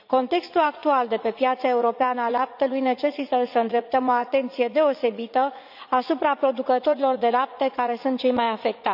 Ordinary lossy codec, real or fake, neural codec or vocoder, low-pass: none; fake; vocoder, 44.1 kHz, 80 mel bands, Vocos; 5.4 kHz